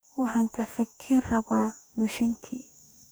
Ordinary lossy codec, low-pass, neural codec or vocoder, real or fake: none; none; codec, 44.1 kHz, 2.6 kbps, DAC; fake